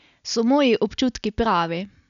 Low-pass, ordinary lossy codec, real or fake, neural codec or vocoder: 7.2 kHz; none; real; none